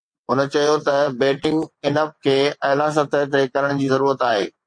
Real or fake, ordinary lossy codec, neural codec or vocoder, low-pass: fake; MP3, 48 kbps; vocoder, 22.05 kHz, 80 mel bands, WaveNeXt; 9.9 kHz